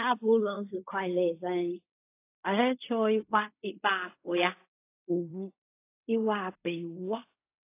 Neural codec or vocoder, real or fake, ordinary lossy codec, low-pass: codec, 16 kHz in and 24 kHz out, 0.4 kbps, LongCat-Audio-Codec, fine tuned four codebook decoder; fake; AAC, 24 kbps; 3.6 kHz